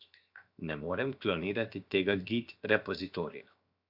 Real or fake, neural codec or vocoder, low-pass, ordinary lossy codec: fake; codec, 16 kHz, 0.7 kbps, FocalCodec; 5.4 kHz; AAC, 48 kbps